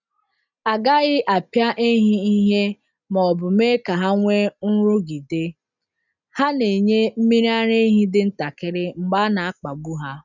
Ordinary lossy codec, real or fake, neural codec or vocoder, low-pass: none; real; none; 7.2 kHz